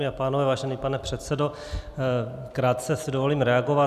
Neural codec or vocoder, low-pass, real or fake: none; 14.4 kHz; real